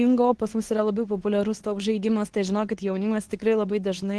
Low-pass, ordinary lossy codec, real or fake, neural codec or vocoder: 10.8 kHz; Opus, 16 kbps; fake; codec, 24 kHz, 0.9 kbps, WavTokenizer, medium speech release version 2